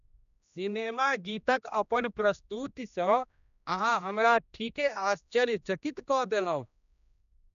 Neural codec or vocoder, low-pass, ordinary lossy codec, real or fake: codec, 16 kHz, 1 kbps, X-Codec, HuBERT features, trained on general audio; 7.2 kHz; none; fake